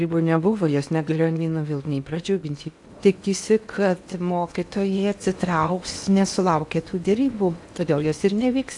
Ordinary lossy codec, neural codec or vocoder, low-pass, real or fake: AAC, 64 kbps; codec, 16 kHz in and 24 kHz out, 0.8 kbps, FocalCodec, streaming, 65536 codes; 10.8 kHz; fake